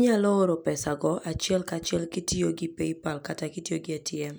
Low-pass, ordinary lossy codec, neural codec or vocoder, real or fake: none; none; none; real